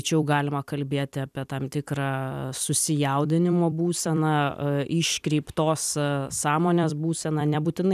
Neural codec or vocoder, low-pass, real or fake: vocoder, 44.1 kHz, 128 mel bands every 256 samples, BigVGAN v2; 14.4 kHz; fake